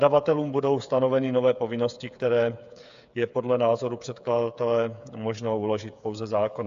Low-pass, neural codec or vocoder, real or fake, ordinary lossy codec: 7.2 kHz; codec, 16 kHz, 8 kbps, FreqCodec, smaller model; fake; AAC, 64 kbps